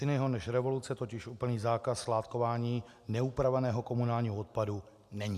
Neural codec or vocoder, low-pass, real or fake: none; 14.4 kHz; real